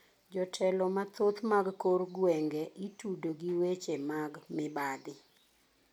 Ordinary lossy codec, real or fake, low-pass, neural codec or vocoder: none; real; 19.8 kHz; none